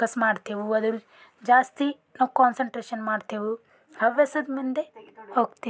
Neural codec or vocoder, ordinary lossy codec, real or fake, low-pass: none; none; real; none